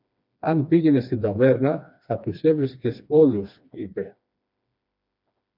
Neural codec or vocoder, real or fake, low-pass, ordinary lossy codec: codec, 16 kHz, 2 kbps, FreqCodec, smaller model; fake; 5.4 kHz; MP3, 48 kbps